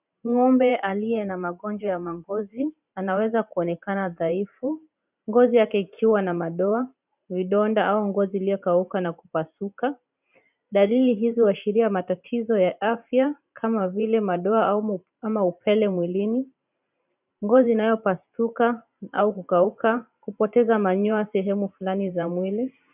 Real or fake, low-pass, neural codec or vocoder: fake; 3.6 kHz; vocoder, 44.1 kHz, 128 mel bands every 512 samples, BigVGAN v2